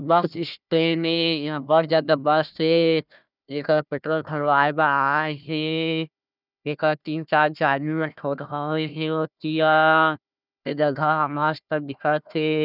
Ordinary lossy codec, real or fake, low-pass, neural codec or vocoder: none; fake; 5.4 kHz; codec, 16 kHz, 1 kbps, FunCodec, trained on Chinese and English, 50 frames a second